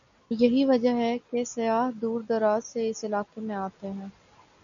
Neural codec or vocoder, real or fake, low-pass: none; real; 7.2 kHz